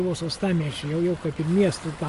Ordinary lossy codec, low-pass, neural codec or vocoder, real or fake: MP3, 48 kbps; 14.4 kHz; none; real